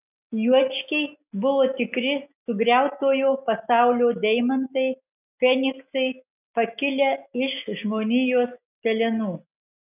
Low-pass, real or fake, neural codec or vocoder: 3.6 kHz; real; none